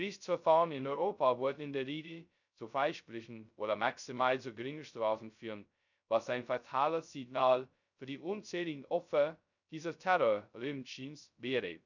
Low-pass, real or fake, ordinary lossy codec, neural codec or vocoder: 7.2 kHz; fake; none; codec, 16 kHz, 0.2 kbps, FocalCodec